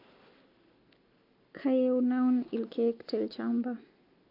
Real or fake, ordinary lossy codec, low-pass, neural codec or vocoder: real; MP3, 48 kbps; 5.4 kHz; none